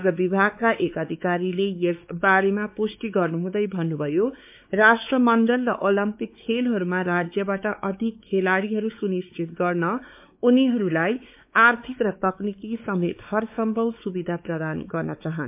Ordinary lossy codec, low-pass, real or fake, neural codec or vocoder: MP3, 32 kbps; 3.6 kHz; fake; codec, 16 kHz, 4 kbps, X-Codec, WavLM features, trained on Multilingual LibriSpeech